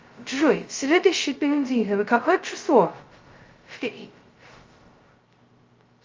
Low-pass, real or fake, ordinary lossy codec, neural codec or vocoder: 7.2 kHz; fake; Opus, 32 kbps; codec, 16 kHz, 0.2 kbps, FocalCodec